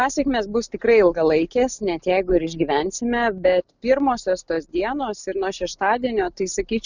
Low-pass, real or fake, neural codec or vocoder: 7.2 kHz; real; none